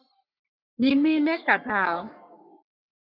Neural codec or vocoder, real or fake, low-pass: codec, 44.1 kHz, 3.4 kbps, Pupu-Codec; fake; 5.4 kHz